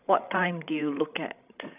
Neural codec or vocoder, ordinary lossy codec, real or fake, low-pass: codec, 16 kHz, 16 kbps, FreqCodec, larger model; none; fake; 3.6 kHz